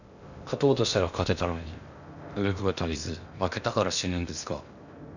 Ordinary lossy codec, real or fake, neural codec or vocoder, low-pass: none; fake; codec, 16 kHz in and 24 kHz out, 0.6 kbps, FocalCodec, streaming, 2048 codes; 7.2 kHz